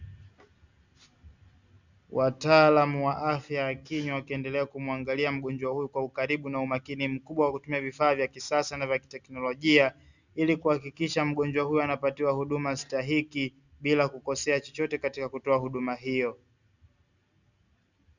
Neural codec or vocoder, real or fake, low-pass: none; real; 7.2 kHz